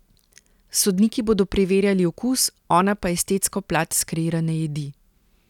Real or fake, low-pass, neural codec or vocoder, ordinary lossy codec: real; 19.8 kHz; none; none